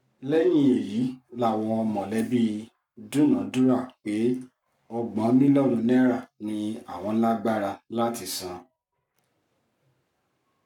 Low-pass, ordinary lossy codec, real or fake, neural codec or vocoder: 19.8 kHz; none; fake; codec, 44.1 kHz, 7.8 kbps, Pupu-Codec